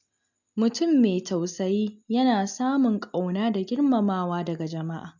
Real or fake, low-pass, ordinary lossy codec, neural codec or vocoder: real; 7.2 kHz; none; none